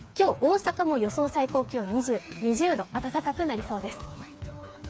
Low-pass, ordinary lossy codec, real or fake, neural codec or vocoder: none; none; fake; codec, 16 kHz, 4 kbps, FreqCodec, smaller model